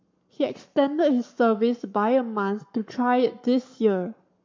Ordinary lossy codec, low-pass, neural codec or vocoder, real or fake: MP3, 64 kbps; 7.2 kHz; codec, 44.1 kHz, 7.8 kbps, Pupu-Codec; fake